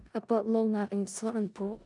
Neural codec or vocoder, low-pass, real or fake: codec, 16 kHz in and 24 kHz out, 0.4 kbps, LongCat-Audio-Codec, four codebook decoder; 10.8 kHz; fake